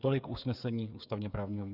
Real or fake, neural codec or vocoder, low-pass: fake; codec, 16 kHz, 8 kbps, FreqCodec, smaller model; 5.4 kHz